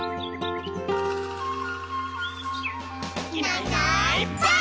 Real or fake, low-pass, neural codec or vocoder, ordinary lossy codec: real; none; none; none